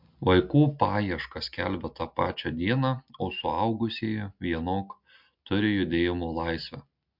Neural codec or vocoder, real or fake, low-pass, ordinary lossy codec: none; real; 5.4 kHz; MP3, 48 kbps